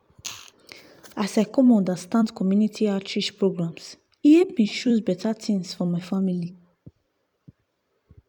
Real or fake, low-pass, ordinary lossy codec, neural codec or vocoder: fake; 19.8 kHz; none; vocoder, 44.1 kHz, 128 mel bands every 256 samples, BigVGAN v2